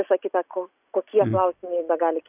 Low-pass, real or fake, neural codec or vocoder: 3.6 kHz; real; none